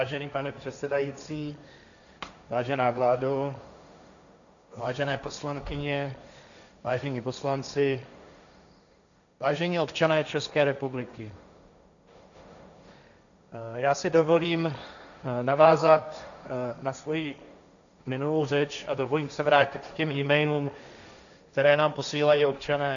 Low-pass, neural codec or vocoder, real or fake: 7.2 kHz; codec, 16 kHz, 1.1 kbps, Voila-Tokenizer; fake